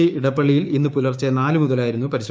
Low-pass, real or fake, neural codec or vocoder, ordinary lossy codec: none; fake; codec, 16 kHz, 6 kbps, DAC; none